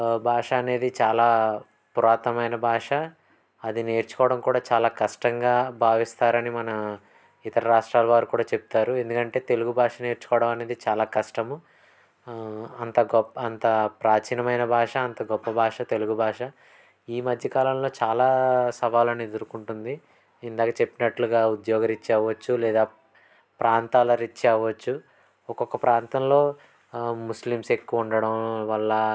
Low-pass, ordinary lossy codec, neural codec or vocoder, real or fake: none; none; none; real